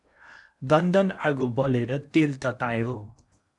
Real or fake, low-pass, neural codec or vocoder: fake; 10.8 kHz; codec, 16 kHz in and 24 kHz out, 0.8 kbps, FocalCodec, streaming, 65536 codes